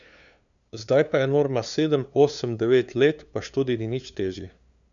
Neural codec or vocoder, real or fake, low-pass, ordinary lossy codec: codec, 16 kHz, 2 kbps, FunCodec, trained on LibriTTS, 25 frames a second; fake; 7.2 kHz; none